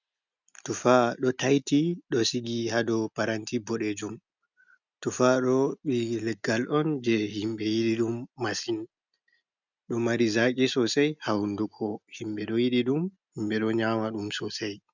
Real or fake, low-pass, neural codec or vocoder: real; 7.2 kHz; none